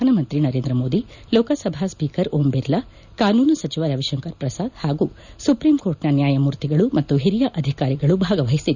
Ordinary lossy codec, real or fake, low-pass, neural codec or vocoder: none; real; 7.2 kHz; none